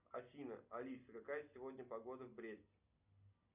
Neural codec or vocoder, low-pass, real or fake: none; 3.6 kHz; real